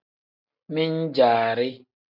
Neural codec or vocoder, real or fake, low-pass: none; real; 5.4 kHz